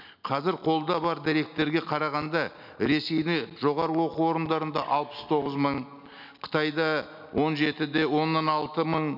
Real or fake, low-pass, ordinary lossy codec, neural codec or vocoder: real; 5.4 kHz; none; none